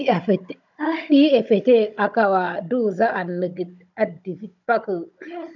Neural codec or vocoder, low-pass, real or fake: codec, 16 kHz, 16 kbps, FunCodec, trained on Chinese and English, 50 frames a second; 7.2 kHz; fake